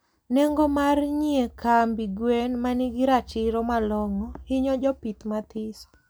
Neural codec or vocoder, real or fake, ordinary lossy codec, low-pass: none; real; none; none